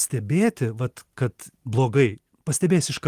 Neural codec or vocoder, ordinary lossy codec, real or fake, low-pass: none; Opus, 24 kbps; real; 14.4 kHz